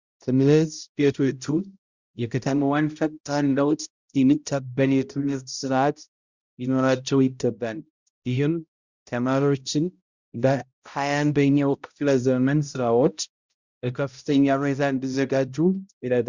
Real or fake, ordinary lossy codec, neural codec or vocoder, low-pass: fake; Opus, 64 kbps; codec, 16 kHz, 0.5 kbps, X-Codec, HuBERT features, trained on balanced general audio; 7.2 kHz